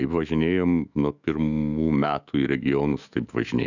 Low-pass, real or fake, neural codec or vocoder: 7.2 kHz; fake; autoencoder, 48 kHz, 128 numbers a frame, DAC-VAE, trained on Japanese speech